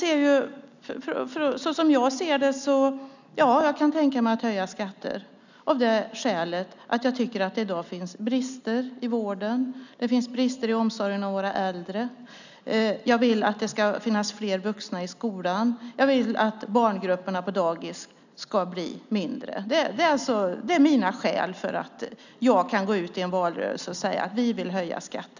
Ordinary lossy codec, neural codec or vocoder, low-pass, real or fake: none; none; 7.2 kHz; real